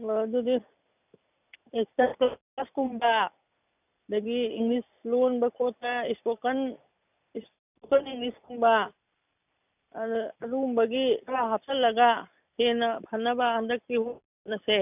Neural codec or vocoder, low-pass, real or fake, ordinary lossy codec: none; 3.6 kHz; real; none